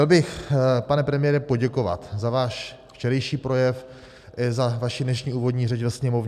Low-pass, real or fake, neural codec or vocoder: 14.4 kHz; real; none